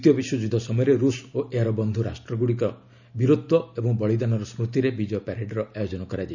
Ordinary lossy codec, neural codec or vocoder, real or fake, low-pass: none; none; real; 7.2 kHz